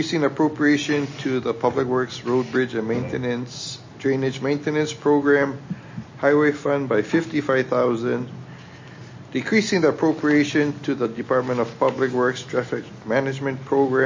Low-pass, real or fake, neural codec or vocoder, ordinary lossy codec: 7.2 kHz; real; none; MP3, 32 kbps